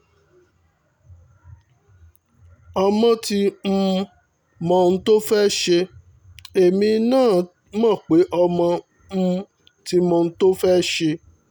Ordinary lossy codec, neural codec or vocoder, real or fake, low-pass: none; none; real; none